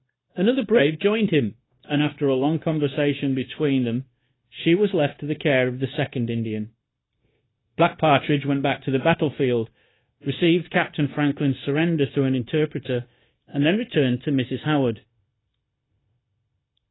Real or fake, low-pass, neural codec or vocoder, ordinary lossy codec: fake; 7.2 kHz; codec, 16 kHz, 0.9 kbps, LongCat-Audio-Codec; AAC, 16 kbps